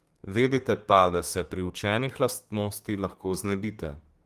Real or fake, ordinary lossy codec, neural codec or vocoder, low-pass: fake; Opus, 24 kbps; codec, 32 kHz, 1.9 kbps, SNAC; 14.4 kHz